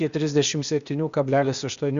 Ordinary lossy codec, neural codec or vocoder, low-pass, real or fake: Opus, 64 kbps; codec, 16 kHz, 0.8 kbps, ZipCodec; 7.2 kHz; fake